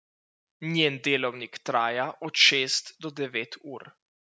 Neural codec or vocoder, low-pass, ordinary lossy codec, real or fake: none; none; none; real